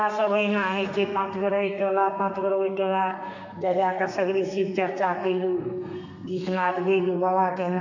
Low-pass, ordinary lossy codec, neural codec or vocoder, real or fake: 7.2 kHz; none; codec, 32 kHz, 1.9 kbps, SNAC; fake